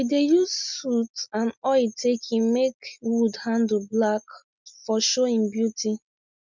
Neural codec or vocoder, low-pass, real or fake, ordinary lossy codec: none; 7.2 kHz; real; none